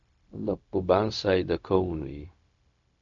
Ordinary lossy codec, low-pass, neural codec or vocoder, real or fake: MP3, 64 kbps; 7.2 kHz; codec, 16 kHz, 0.4 kbps, LongCat-Audio-Codec; fake